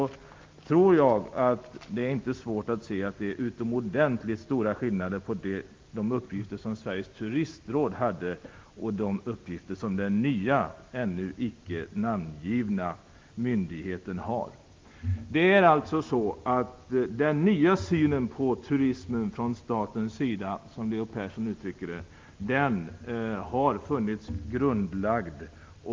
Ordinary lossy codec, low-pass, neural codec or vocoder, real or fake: Opus, 32 kbps; 7.2 kHz; none; real